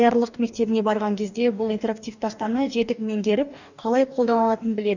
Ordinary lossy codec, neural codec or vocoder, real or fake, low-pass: none; codec, 44.1 kHz, 2.6 kbps, DAC; fake; 7.2 kHz